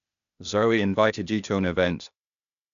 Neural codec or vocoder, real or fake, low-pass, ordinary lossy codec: codec, 16 kHz, 0.8 kbps, ZipCodec; fake; 7.2 kHz; none